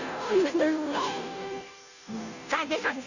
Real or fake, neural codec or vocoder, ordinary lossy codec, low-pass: fake; codec, 16 kHz, 0.5 kbps, FunCodec, trained on Chinese and English, 25 frames a second; none; 7.2 kHz